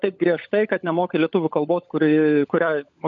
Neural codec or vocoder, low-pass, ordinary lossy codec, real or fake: codec, 16 kHz, 16 kbps, FunCodec, trained on LibriTTS, 50 frames a second; 7.2 kHz; MP3, 64 kbps; fake